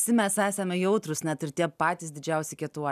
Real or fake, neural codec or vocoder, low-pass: real; none; 14.4 kHz